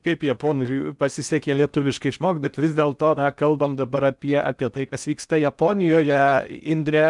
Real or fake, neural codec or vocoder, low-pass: fake; codec, 16 kHz in and 24 kHz out, 0.8 kbps, FocalCodec, streaming, 65536 codes; 10.8 kHz